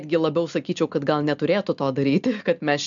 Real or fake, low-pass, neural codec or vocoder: real; 7.2 kHz; none